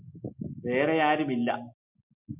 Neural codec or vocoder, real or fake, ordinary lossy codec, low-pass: none; real; MP3, 32 kbps; 3.6 kHz